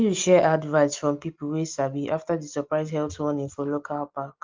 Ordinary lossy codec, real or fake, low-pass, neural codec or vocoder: Opus, 24 kbps; real; 7.2 kHz; none